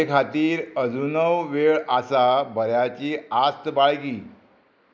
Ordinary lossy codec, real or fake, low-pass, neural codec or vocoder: none; real; none; none